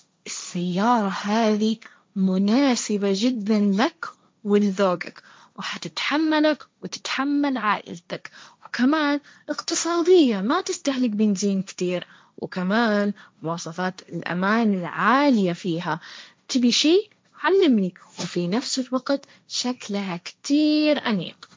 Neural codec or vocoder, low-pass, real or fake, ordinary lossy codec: codec, 16 kHz, 1.1 kbps, Voila-Tokenizer; none; fake; none